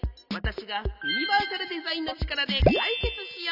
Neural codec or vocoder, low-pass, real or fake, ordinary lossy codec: none; 5.4 kHz; real; none